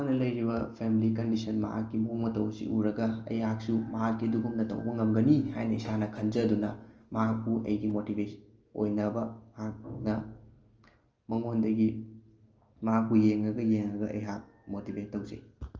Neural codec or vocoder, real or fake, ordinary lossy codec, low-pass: none; real; Opus, 24 kbps; 7.2 kHz